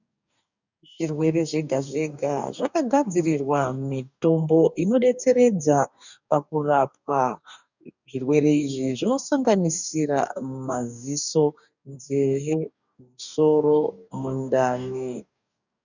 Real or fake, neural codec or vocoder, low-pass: fake; codec, 44.1 kHz, 2.6 kbps, DAC; 7.2 kHz